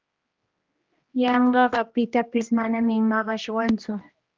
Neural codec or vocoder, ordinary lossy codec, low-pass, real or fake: codec, 16 kHz, 1 kbps, X-Codec, HuBERT features, trained on general audio; Opus, 32 kbps; 7.2 kHz; fake